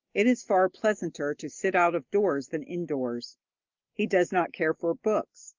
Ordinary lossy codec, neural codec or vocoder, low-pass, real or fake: Opus, 32 kbps; none; 7.2 kHz; real